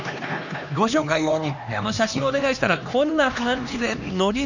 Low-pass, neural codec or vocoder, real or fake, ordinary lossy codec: 7.2 kHz; codec, 16 kHz, 2 kbps, X-Codec, HuBERT features, trained on LibriSpeech; fake; none